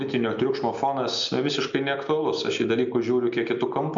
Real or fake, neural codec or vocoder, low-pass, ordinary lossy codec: real; none; 7.2 kHz; MP3, 64 kbps